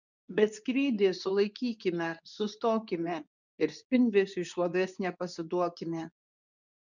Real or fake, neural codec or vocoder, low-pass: fake; codec, 24 kHz, 0.9 kbps, WavTokenizer, medium speech release version 2; 7.2 kHz